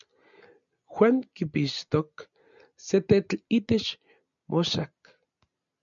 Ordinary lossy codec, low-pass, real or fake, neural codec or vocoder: MP3, 96 kbps; 7.2 kHz; real; none